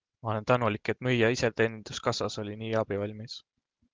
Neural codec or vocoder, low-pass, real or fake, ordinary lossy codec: none; 7.2 kHz; real; Opus, 16 kbps